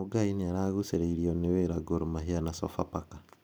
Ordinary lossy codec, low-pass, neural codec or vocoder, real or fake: none; none; none; real